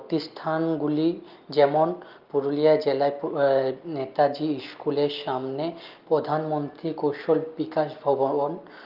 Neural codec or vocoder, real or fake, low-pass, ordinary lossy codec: none; real; 5.4 kHz; Opus, 24 kbps